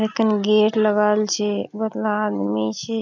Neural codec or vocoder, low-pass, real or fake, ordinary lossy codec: none; 7.2 kHz; real; none